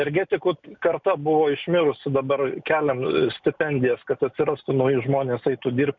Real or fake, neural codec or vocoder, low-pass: real; none; 7.2 kHz